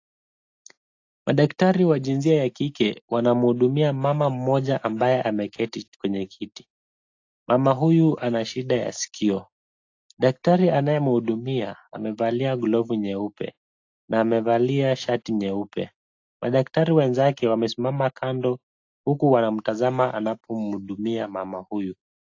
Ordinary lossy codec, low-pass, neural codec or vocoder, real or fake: AAC, 48 kbps; 7.2 kHz; none; real